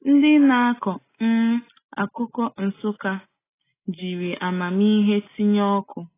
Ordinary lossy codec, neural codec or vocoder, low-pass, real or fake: AAC, 16 kbps; none; 3.6 kHz; real